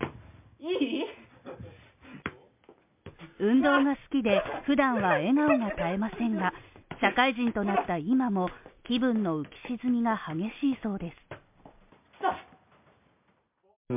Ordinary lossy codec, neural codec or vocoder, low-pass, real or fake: MP3, 32 kbps; none; 3.6 kHz; real